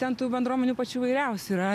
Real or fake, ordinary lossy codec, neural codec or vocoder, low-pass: real; MP3, 96 kbps; none; 14.4 kHz